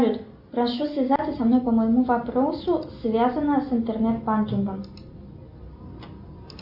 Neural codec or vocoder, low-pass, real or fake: none; 5.4 kHz; real